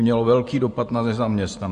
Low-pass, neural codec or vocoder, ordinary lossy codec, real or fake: 14.4 kHz; vocoder, 44.1 kHz, 128 mel bands every 512 samples, BigVGAN v2; MP3, 48 kbps; fake